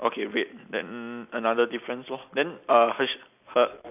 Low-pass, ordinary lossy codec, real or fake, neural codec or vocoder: 3.6 kHz; none; real; none